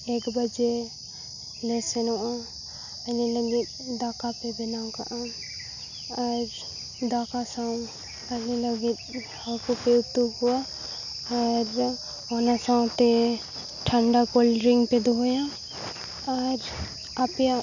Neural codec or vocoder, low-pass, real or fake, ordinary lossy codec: none; 7.2 kHz; real; none